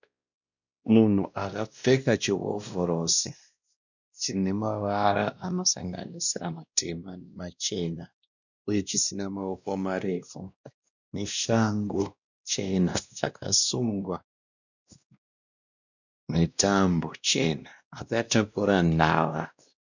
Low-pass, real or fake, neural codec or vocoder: 7.2 kHz; fake; codec, 16 kHz, 1 kbps, X-Codec, WavLM features, trained on Multilingual LibriSpeech